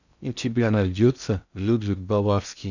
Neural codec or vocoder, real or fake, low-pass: codec, 16 kHz in and 24 kHz out, 0.6 kbps, FocalCodec, streaming, 2048 codes; fake; 7.2 kHz